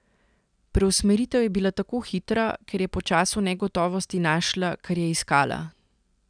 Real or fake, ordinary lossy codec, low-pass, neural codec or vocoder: real; none; 9.9 kHz; none